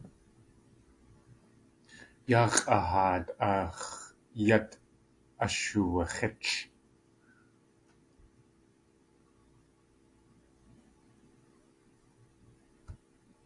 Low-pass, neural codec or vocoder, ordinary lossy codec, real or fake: 10.8 kHz; none; AAC, 48 kbps; real